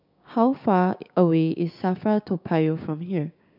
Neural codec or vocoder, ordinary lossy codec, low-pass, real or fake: codec, 16 kHz, 6 kbps, DAC; none; 5.4 kHz; fake